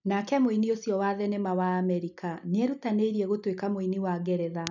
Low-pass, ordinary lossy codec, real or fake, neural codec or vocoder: 7.2 kHz; none; real; none